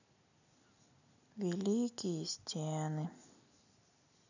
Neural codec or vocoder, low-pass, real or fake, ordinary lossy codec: none; 7.2 kHz; real; none